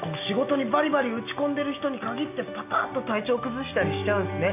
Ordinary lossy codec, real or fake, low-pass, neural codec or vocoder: none; real; 3.6 kHz; none